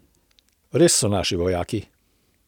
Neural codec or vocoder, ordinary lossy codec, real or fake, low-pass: none; none; real; 19.8 kHz